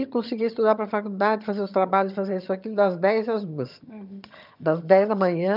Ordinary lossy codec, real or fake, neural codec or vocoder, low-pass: none; fake; vocoder, 22.05 kHz, 80 mel bands, HiFi-GAN; 5.4 kHz